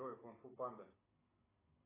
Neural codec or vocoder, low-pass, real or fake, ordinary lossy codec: none; 3.6 kHz; real; AAC, 16 kbps